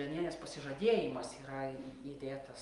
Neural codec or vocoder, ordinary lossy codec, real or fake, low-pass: none; Opus, 32 kbps; real; 10.8 kHz